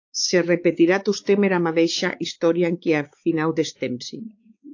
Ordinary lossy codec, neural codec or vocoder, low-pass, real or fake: AAC, 48 kbps; codec, 16 kHz, 4 kbps, X-Codec, WavLM features, trained on Multilingual LibriSpeech; 7.2 kHz; fake